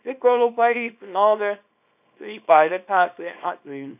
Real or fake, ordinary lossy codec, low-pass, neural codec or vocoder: fake; none; 3.6 kHz; codec, 24 kHz, 0.9 kbps, WavTokenizer, small release